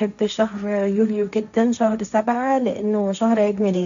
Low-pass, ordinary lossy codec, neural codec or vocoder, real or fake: 7.2 kHz; none; codec, 16 kHz, 1.1 kbps, Voila-Tokenizer; fake